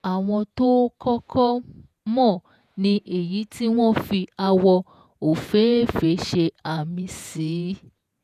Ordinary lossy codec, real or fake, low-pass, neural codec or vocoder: none; fake; 14.4 kHz; vocoder, 48 kHz, 128 mel bands, Vocos